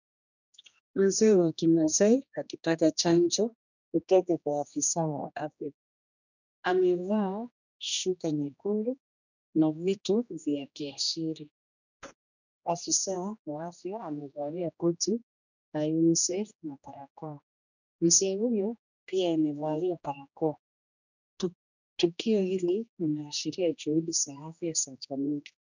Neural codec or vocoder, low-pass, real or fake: codec, 16 kHz, 1 kbps, X-Codec, HuBERT features, trained on general audio; 7.2 kHz; fake